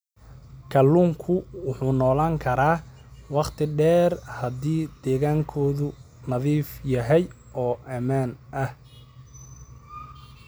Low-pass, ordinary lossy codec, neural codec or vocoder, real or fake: none; none; none; real